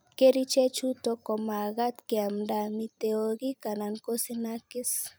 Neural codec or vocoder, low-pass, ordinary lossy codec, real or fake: vocoder, 44.1 kHz, 128 mel bands every 256 samples, BigVGAN v2; none; none; fake